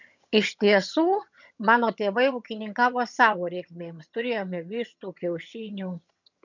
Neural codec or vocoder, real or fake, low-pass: vocoder, 22.05 kHz, 80 mel bands, HiFi-GAN; fake; 7.2 kHz